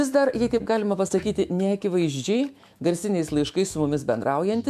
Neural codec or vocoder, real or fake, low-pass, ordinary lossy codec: autoencoder, 48 kHz, 128 numbers a frame, DAC-VAE, trained on Japanese speech; fake; 14.4 kHz; MP3, 96 kbps